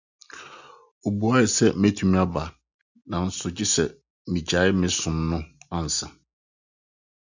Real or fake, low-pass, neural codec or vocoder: real; 7.2 kHz; none